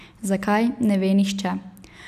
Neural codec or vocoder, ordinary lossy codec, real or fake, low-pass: none; none; real; 14.4 kHz